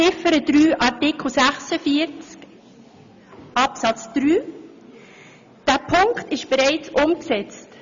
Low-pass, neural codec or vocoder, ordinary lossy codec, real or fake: 7.2 kHz; none; MP3, 64 kbps; real